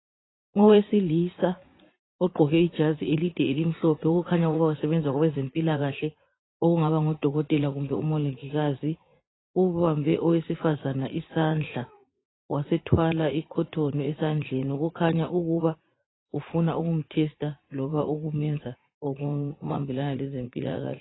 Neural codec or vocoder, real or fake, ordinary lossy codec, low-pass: vocoder, 44.1 kHz, 80 mel bands, Vocos; fake; AAC, 16 kbps; 7.2 kHz